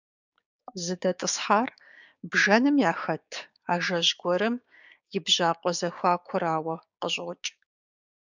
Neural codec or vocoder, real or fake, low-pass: codec, 16 kHz, 6 kbps, DAC; fake; 7.2 kHz